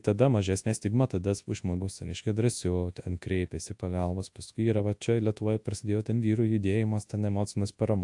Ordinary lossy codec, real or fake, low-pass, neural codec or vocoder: AAC, 64 kbps; fake; 10.8 kHz; codec, 24 kHz, 0.9 kbps, WavTokenizer, large speech release